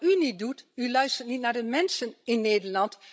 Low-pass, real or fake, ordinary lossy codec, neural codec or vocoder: none; fake; none; codec, 16 kHz, 16 kbps, FreqCodec, larger model